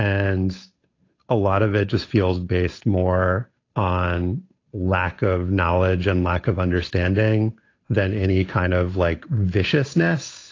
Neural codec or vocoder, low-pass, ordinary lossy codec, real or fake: codec, 16 kHz, 8 kbps, FunCodec, trained on Chinese and English, 25 frames a second; 7.2 kHz; AAC, 32 kbps; fake